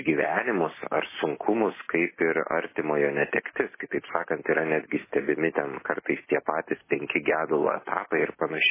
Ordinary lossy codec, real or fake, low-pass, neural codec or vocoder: MP3, 16 kbps; real; 3.6 kHz; none